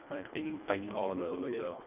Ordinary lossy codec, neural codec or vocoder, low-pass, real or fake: none; codec, 24 kHz, 1.5 kbps, HILCodec; 3.6 kHz; fake